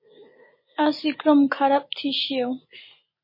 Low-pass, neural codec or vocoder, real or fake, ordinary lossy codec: 5.4 kHz; none; real; MP3, 24 kbps